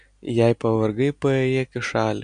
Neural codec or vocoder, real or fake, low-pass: none; real; 9.9 kHz